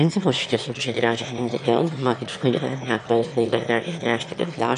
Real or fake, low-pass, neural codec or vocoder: fake; 9.9 kHz; autoencoder, 22.05 kHz, a latent of 192 numbers a frame, VITS, trained on one speaker